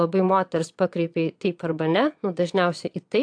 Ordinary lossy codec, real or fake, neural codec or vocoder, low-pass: AAC, 64 kbps; fake; vocoder, 22.05 kHz, 80 mel bands, Vocos; 9.9 kHz